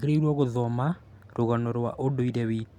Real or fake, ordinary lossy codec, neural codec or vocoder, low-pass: real; none; none; 19.8 kHz